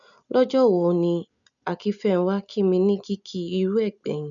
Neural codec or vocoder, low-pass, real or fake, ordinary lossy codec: none; 7.2 kHz; real; none